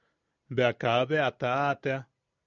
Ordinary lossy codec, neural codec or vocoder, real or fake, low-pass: MP3, 96 kbps; none; real; 7.2 kHz